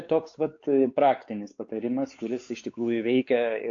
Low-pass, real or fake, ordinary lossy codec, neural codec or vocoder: 7.2 kHz; fake; AAC, 48 kbps; codec, 16 kHz, 4 kbps, X-Codec, WavLM features, trained on Multilingual LibriSpeech